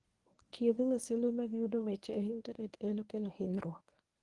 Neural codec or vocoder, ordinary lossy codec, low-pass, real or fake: codec, 24 kHz, 0.9 kbps, WavTokenizer, medium speech release version 1; Opus, 24 kbps; 10.8 kHz; fake